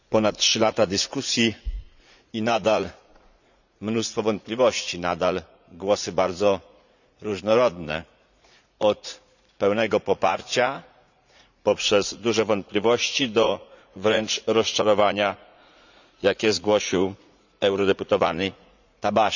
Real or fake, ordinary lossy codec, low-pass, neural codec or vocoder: fake; none; 7.2 kHz; vocoder, 44.1 kHz, 80 mel bands, Vocos